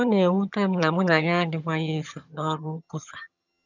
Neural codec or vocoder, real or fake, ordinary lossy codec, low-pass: vocoder, 22.05 kHz, 80 mel bands, HiFi-GAN; fake; none; 7.2 kHz